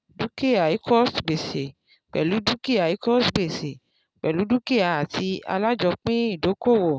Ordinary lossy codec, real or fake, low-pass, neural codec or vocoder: none; real; none; none